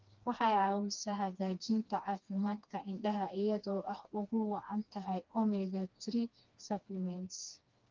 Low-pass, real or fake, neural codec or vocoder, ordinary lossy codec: 7.2 kHz; fake; codec, 16 kHz, 2 kbps, FreqCodec, smaller model; Opus, 32 kbps